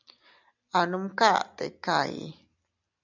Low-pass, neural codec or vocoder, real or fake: 7.2 kHz; none; real